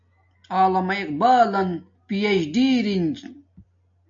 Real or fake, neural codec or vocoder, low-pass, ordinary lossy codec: real; none; 7.2 kHz; AAC, 64 kbps